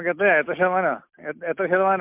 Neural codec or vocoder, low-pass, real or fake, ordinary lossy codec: none; 3.6 kHz; real; MP3, 32 kbps